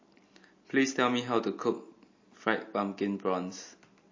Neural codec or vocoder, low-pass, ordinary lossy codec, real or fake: none; 7.2 kHz; MP3, 32 kbps; real